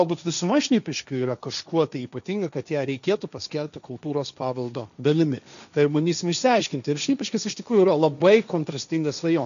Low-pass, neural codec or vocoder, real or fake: 7.2 kHz; codec, 16 kHz, 1.1 kbps, Voila-Tokenizer; fake